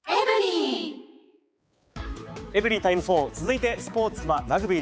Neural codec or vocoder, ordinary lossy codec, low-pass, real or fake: codec, 16 kHz, 4 kbps, X-Codec, HuBERT features, trained on balanced general audio; none; none; fake